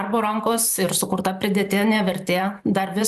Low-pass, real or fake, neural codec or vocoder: 14.4 kHz; fake; vocoder, 44.1 kHz, 128 mel bands every 512 samples, BigVGAN v2